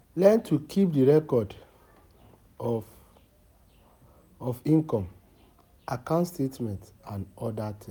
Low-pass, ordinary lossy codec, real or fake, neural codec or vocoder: none; none; real; none